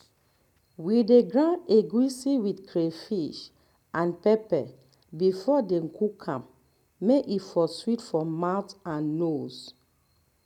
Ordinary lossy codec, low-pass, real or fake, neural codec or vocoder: none; 19.8 kHz; real; none